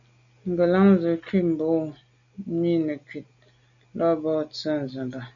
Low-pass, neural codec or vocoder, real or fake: 7.2 kHz; none; real